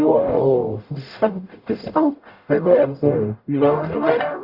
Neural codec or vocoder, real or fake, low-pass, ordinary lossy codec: codec, 44.1 kHz, 0.9 kbps, DAC; fake; 5.4 kHz; Opus, 64 kbps